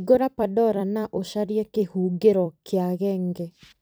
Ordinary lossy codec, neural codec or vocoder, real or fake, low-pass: none; vocoder, 44.1 kHz, 128 mel bands every 256 samples, BigVGAN v2; fake; none